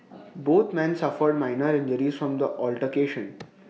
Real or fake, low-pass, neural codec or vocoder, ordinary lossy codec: real; none; none; none